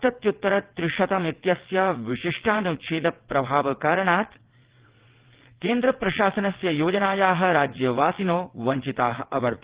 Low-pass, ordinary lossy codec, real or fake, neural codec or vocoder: 3.6 kHz; Opus, 16 kbps; fake; vocoder, 22.05 kHz, 80 mel bands, WaveNeXt